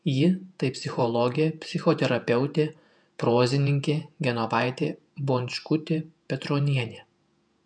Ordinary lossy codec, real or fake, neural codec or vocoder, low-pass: MP3, 96 kbps; fake; vocoder, 48 kHz, 128 mel bands, Vocos; 9.9 kHz